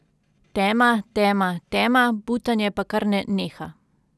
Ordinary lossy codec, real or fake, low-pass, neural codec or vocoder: none; real; none; none